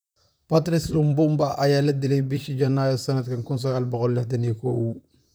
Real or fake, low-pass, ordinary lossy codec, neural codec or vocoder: fake; none; none; vocoder, 44.1 kHz, 128 mel bands, Pupu-Vocoder